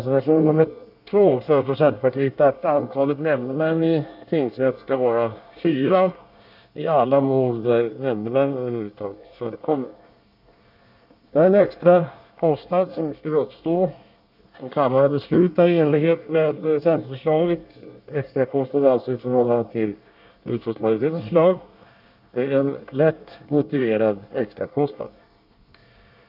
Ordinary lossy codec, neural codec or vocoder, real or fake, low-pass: none; codec, 24 kHz, 1 kbps, SNAC; fake; 5.4 kHz